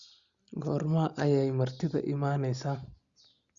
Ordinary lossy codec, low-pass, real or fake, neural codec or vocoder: Opus, 64 kbps; 7.2 kHz; real; none